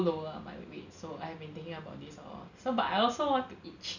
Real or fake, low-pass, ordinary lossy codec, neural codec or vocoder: real; 7.2 kHz; none; none